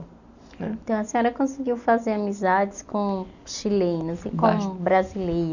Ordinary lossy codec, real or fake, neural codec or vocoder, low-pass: none; real; none; 7.2 kHz